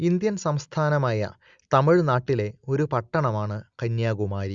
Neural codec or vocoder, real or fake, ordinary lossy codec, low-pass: none; real; Opus, 64 kbps; 7.2 kHz